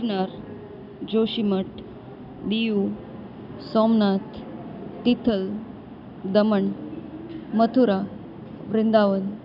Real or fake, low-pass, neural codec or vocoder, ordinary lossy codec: real; 5.4 kHz; none; none